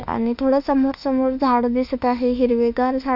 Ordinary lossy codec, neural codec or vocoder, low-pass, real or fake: none; autoencoder, 48 kHz, 32 numbers a frame, DAC-VAE, trained on Japanese speech; 5.4 kHz; fake